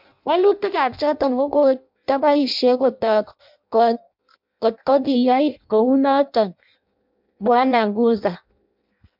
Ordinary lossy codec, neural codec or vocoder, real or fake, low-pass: MP3, 48 kbps; codec, 16 kHz in and 24 kHz out, 0.6 kbps, FireRedTTS-2 codec; fake; 5.4 kHz